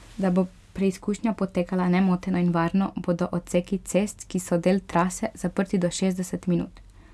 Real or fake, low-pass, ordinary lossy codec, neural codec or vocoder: real; none; none; none